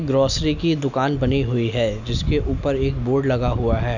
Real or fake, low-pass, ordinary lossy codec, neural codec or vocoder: real; 7.2 kHz; none; none